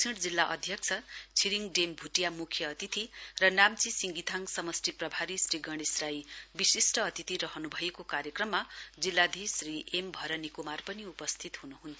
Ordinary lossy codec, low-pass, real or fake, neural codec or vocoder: none; none; real; none